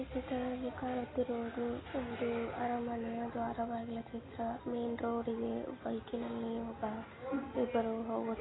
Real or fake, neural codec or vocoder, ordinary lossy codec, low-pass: real; none; AAC, 16 kbps; 7.2 kHz